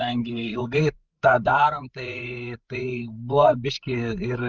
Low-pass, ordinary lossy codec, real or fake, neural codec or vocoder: 7.2 kHz; Opus, 32 kbps; fake; codec, 16 kHz, 8 kbps, FreqCodec, larger model